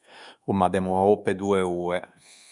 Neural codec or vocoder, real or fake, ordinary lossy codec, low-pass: codec, 24 kHz, 1.2 kbps, DualCodec; fake; Opus, 64 kbps; 10.8 kHz